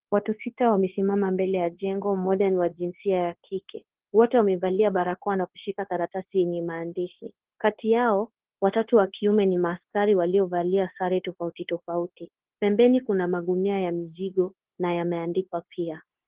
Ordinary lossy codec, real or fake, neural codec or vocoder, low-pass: Opus, 16 kbps; fake; codec, 16 kHz, 0.9 kbps, LongCat-Audio-Codec; 3.6 kHz